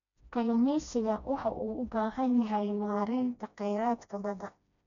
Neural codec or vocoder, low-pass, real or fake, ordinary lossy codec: codec, 16 kHz, 1 kbps, FreqCodec, smaller model; 7.2 kHz; fake; none